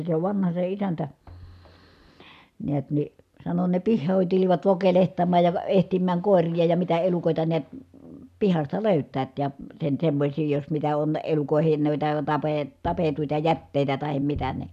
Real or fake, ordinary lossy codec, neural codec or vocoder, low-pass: real; none; none; 14.4 kHz